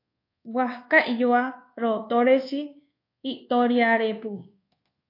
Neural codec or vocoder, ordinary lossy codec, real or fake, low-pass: codec, 24 kHz, 1.2 kbps, DualCodec; AAC, 32 kbps; fake; 5.4 kHz